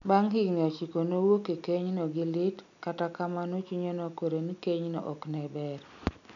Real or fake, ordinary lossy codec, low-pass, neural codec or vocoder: real; none; 7.2 kHz; none